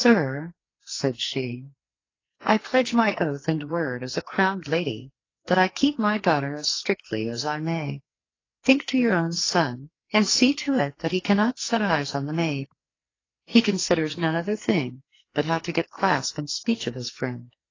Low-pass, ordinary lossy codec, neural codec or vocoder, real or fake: 7.2 kHz; AAC, 32 kbps; codec, 44.1 kHz, 2.6 kbps, SNAC; fake